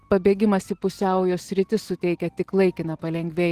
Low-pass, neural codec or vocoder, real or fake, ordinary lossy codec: 14.4 kHz; none; real; Opus, 16 kbps